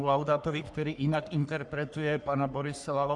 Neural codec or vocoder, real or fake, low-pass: codec, 44.1 kHz, 3.4 kbps, Pupu-Codec; fake; 10.8 kHz